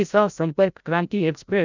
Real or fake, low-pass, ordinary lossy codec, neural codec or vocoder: fake; 7.2 kHz; none; codec, 16 kHz, 0.5 kbps, FreqCodec, larger model